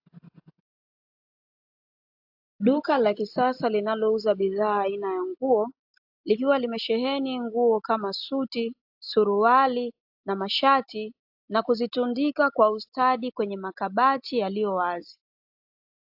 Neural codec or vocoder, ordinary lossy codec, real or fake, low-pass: none; AAC, 48 kbps; real; 5.4 kHz